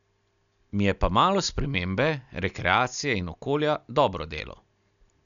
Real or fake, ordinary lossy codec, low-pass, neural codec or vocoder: real; none; 7.2 kHz; none